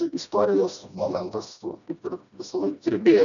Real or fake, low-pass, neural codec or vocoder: fake; 7.2 kHz; codec, 16 kHz, 1 kbps, FreqCodec, smaller model